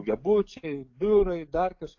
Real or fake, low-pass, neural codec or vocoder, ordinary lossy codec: fake; 7.2 kHz; codec, 44.1 kHz, 7.8 kbps, DAC; AAC, 48 kbps